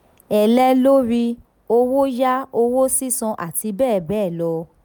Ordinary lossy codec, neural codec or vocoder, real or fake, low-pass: none; none; real; none